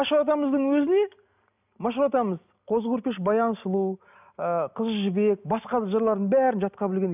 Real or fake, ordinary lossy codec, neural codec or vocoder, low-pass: real; none; none; 3.6 kHz